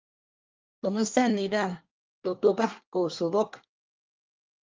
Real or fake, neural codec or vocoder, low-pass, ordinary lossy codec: fake; codec, 24 kHz, 1 kbps, SNAC; 7.2 kHz; Opus, 24 kbps